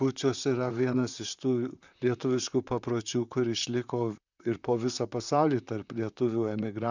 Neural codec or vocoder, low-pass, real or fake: vocoder, 44.1 kHz, 128 mel bands, Pupu-Vocoder; 7.2 kHz; fake